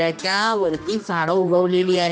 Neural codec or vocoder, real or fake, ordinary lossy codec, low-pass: codec, 16 kHz, 1 kbps, X-Codec, HuBERT features, trained on general audio; fake; none; none